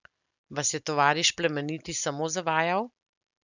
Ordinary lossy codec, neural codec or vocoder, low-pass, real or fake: none; none; 7.2 kHz; real